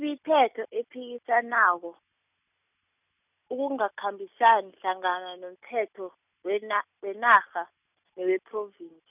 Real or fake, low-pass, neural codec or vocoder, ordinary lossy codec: real; 3.6 kHz; none; none